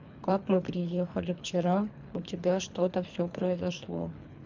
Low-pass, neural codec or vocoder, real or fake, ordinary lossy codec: 7.2 kHz; codec, 24 kHz, 3 kbps, HILCodec; fake; MP3, 64 kbps